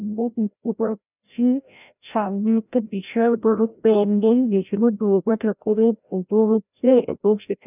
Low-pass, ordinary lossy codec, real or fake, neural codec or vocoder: 3.6 kHz; none; fake; codec, 16 kHz, 0.5 kbps, FreqCodec, larger model